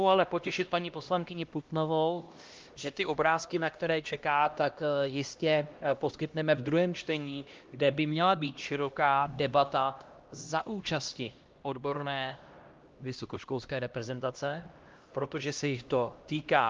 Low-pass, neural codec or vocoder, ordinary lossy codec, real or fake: 7.2 kHz; codec, 16 kHz, 1 kbps, X-Codec, HuBERT features, trained on LibriSpeech; Opus, 32 kbps; fake